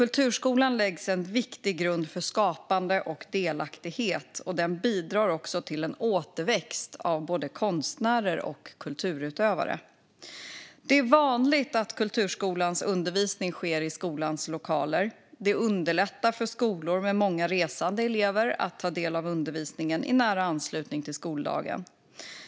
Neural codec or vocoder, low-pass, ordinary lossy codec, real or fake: none; none; none; real